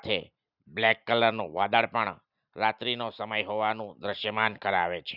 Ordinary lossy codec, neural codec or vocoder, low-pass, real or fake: none; none; 5.4 kHz; real